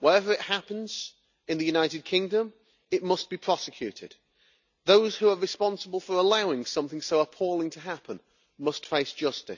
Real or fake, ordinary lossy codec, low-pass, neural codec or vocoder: real; none; 7.2 kHz; none